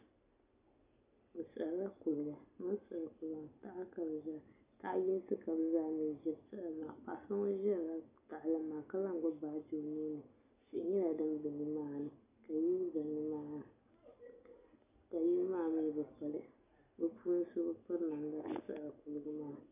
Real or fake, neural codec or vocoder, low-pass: real; none; 3.6 kHz